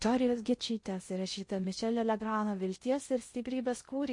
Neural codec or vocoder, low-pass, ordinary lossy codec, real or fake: codec, 16 kHz in and 24 kHz out, 0.6 kbps, FocalCodec, streaming, 2048 codes; 10.8 kHz; MP3, 48 kbps; fake